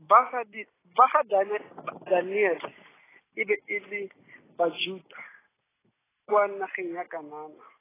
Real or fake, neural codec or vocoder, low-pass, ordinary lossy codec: real; none; 3.6 kHz; AAC, 16 kbps